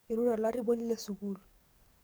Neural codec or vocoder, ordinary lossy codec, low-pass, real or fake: codec, 44.1 kHz, 7.8 kbps, DAC; none; none; fake